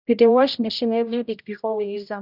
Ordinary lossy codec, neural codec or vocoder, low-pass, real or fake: none; codec, 16 kHz, 0.5 kbps, X-Codec, HuBERT features, trained on general audio; 5.4 kHz; fake